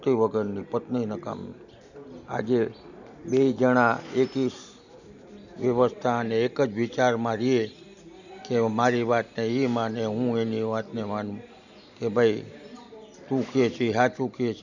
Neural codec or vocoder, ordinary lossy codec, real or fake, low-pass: none; none; real; 7.2 kHz